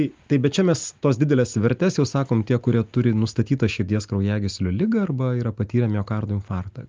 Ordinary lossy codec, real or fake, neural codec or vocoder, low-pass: Opus, 32 kbps; real; none; 7.2 kHz